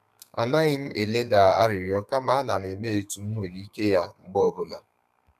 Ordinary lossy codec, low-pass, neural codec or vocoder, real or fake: none; 14.4 kHz; codec, 32 kHz, 1.9 kbps, SNAC; fake